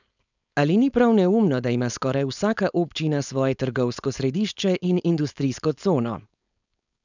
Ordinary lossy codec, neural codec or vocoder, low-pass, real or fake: none; codec, 16 kHz, 4.8 kbps, FACodec; 7.2 kHz; fake